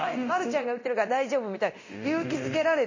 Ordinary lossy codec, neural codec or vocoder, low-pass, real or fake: MP3, 32 kbps; codec, 24 kHz, 0.9 kbps, DualCodec; 7.2 kHz; fake